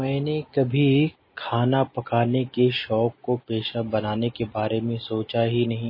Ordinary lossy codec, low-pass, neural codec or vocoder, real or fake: MP3, 24 kbps; 5.4 kHz; none; real